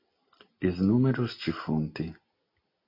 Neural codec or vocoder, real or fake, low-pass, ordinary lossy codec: none; real; 5.4 kHz; MP3, 48 kbps